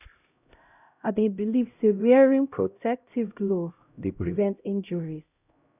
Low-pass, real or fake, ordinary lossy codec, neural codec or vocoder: 3.6 kHz; fake; none; codec, 16 kHz, 0.5 kbps, X-Codec, HuBERT features, trained on LibriSpeech